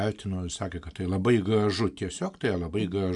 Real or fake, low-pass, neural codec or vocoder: real; 10.8 kHz; none